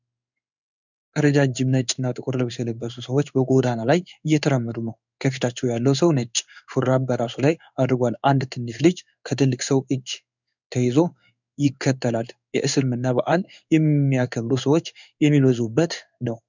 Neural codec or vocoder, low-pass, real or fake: codec, 16 kHz in and 24 kHz out, 1 kbps, XY-Tokenizer; 7.2 kHz; fake